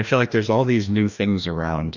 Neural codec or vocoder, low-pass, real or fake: codec, 16 kHz, 1 kbps, FreqCodec, larger model; 7.2 kHz; fake